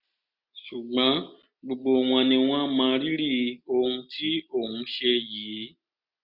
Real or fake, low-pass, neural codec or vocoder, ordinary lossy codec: real; 5.4 kHz; none; none